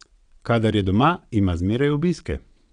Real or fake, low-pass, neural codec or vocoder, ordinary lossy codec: fake; 9.9 kHz; vocoder, 22.05 kHz, 80 mel bands, WaveNeXt; none